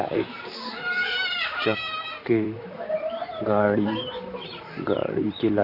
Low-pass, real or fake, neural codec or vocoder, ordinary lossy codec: 5.4 kHz; fake; vocoder, 44.1 kHz, 128 mel bands, Pupu-Vocoder; none